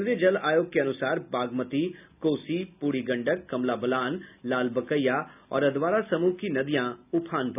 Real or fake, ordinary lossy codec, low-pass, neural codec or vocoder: real; none; 3.6 kHz; none